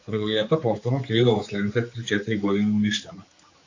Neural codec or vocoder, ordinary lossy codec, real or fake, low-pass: codec, 16 kHz, 4 kbps, X-Codec, HuBERT features, trained on general audio; AAC, 48 kbps; fake; 7.2 kHz